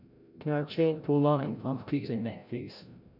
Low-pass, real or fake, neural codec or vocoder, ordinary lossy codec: 5.4 kHz; fake; codec, 16 kHz, 0.5 kbps, FreqCodec, larger model; none